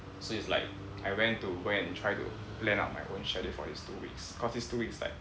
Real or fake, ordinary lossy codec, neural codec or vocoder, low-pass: real; none; none; none